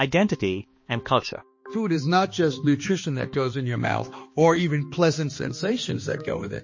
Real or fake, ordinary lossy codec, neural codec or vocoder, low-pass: fake; MP3, 32 kbps; codec, 16 kHz, 2 kbps, X-Codec, HuBERT features, trained on balanced general audio; 7.2 kHz